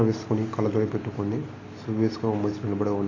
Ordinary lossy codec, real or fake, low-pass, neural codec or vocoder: AAC, 32 kbps; real; 7.2 kHz; none